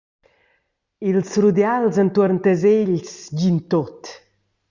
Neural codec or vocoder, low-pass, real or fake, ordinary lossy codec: none; 7.2 kHz; real; Opus, 64 kbps